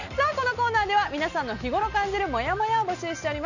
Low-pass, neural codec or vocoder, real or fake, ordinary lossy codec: 7.2 kHz; none; real; none